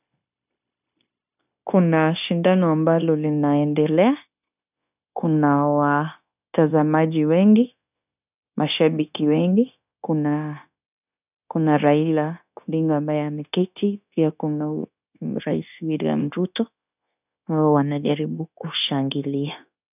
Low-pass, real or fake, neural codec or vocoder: 3.6 kHz; fake; codec, 16 kHz, 0.9 kbps, LongCat-Audio-Codec